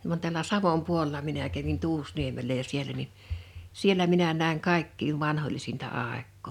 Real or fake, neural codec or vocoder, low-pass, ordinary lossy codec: real; none; 19.8 kHz; none